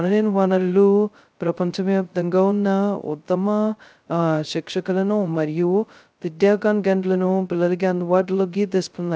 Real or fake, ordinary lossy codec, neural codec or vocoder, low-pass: fake; none; codec, 16 kHz, 0.2 kbps, FocalCodec; none